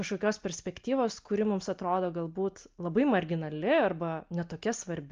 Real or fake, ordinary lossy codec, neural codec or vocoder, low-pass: real; Opus, 24 kbps; none; 7.2 kHz